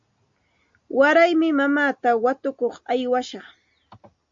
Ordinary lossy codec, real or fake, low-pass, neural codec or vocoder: MP3, 48 kbps; real; 7.2 kHz; none